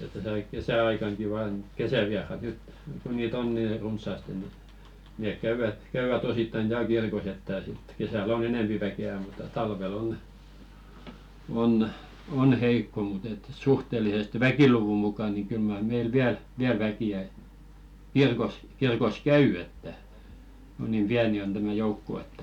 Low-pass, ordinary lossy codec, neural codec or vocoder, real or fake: 19.8 kHz; none; none; real